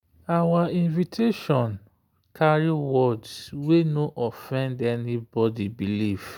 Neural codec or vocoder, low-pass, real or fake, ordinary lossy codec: none; 19.8 kHz; real; none